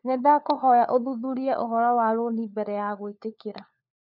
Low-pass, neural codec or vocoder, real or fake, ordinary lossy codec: 5.4 kHz; codec, 16 kHz, 4 kbps, FunCodec, trained on LibriTTS, 50 frames a second; fake; none